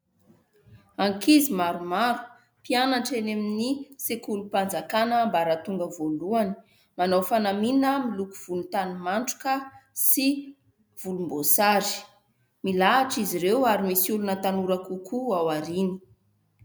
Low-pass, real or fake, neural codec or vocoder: 19.8 kHz; real; none